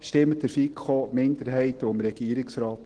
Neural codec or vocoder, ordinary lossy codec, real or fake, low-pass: none; Opus, 16 kbps; real; 9.9 kHz